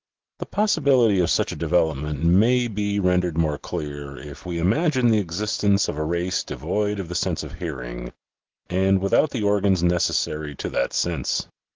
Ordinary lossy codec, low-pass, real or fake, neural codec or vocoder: Opus, 16 kbps; 7.2 kHz; real; none